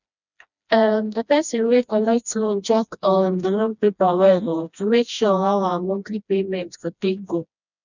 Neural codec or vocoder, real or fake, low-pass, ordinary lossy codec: codec, 16 kHz, 1 kbps, FreqCodec, smaller model; fake; 7.2 kHz; none